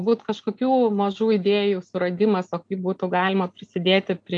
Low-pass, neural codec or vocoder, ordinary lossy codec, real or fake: 9.9 kHz; none; AAC, 64 kbps; real